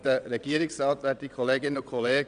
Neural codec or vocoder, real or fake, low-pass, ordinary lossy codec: vocoder, 22.05 kHz, 80 mel bands, WaveNeXt; fake; 9.9 kHz; none